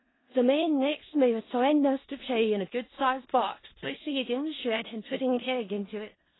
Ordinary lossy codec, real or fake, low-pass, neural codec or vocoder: AAC, 16 kbps; fake; 7.2 kHz; codec, 16 kHz in and 24 kHz out, 0.4 kbps, LongCat-Audio-Codec, four codebook decoder